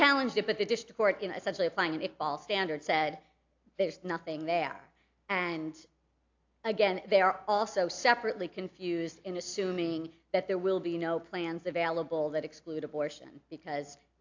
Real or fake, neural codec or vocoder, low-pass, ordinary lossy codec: real; none; 7.2 kHz; AAC, 48 kbps